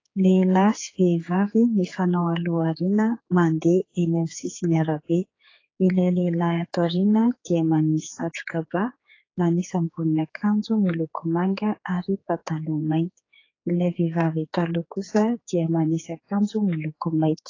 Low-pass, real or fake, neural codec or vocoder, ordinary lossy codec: 7.2 kHz; fake; codec, 16 kHz, 4 kbps, X-Codec, HuBERT features, trained on general audio; AAC, 32 kbps